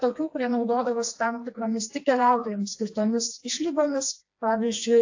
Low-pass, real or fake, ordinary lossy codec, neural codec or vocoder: 7.2 kHz; fake; AAC, 48 kbps; codec, 16 kHz, 2 kbps, FreqCodec, smaller model